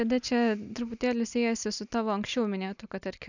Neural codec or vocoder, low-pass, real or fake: none; 7.2 kHz; real